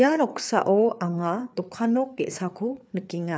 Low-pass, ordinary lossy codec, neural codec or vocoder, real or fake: none; none; codec, 16 kHz, 4 kbps, FreqCodec, larger model; fake